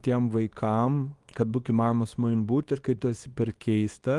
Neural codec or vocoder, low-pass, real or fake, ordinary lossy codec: codec, 24 kHz, 0.9 kbps, WavTokenizer, small release; 10.8 kHz; fake; Opus, 24 kbps